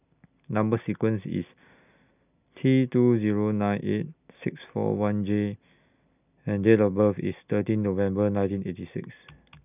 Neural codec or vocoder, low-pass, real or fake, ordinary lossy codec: none; 3.6 kHz; real; none